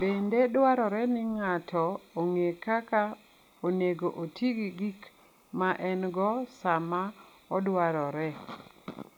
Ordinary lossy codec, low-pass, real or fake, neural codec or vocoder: none; 19.8 kHz; real; none